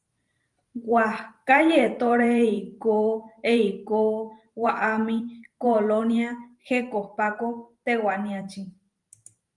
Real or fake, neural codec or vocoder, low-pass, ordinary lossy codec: fake; vocoder, 44.1 kHz, 128 mel bands every 512 samples, BigVGAN v2; 10.8 kHz; Opus, 32 kbps